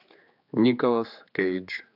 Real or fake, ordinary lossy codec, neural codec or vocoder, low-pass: fake; AAC, 48 kbps; codec, 16 kHz, 4 kbps, X-Codec, HuBERT features, trained on general audio; 5.4 kHz